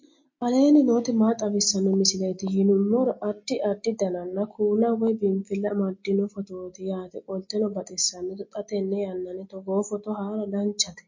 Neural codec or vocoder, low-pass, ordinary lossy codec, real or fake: none; 7.2 kHz; MP3, 32 kbps; real